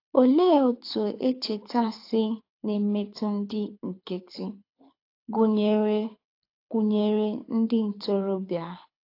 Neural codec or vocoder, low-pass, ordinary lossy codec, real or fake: codec, 24 kHz, 6 kbps, HILCodec; 5.4 kHz; AAC, 32 kbps; fake